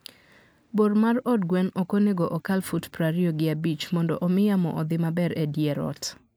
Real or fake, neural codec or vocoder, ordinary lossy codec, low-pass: real; none; none; none